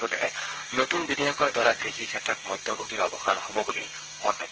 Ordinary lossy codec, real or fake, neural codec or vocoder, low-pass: Opus, 16 kbps; fake; codec, 32 kHz, 1.9 kbps, SNAC; 7.2 kHz